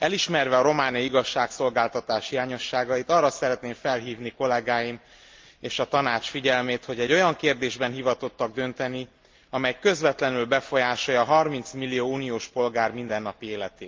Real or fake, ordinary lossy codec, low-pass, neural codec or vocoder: real; Opus, 32 kbps; 7.2 kHz; none